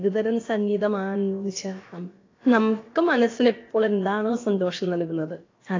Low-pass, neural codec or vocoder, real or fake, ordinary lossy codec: 7.2 kHz; codec, 16 kHz, about 1 kbps, DyCAST, with the encoder's durations; fake; AAC, 32 kbps